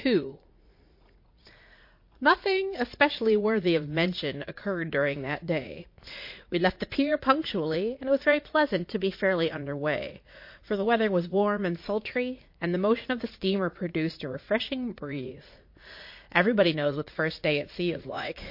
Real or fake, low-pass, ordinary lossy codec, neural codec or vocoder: fake; 5.4 kHz; MP3, 32 kbps; vocoder, 44.1 kHz, 80 mel bands, Vocos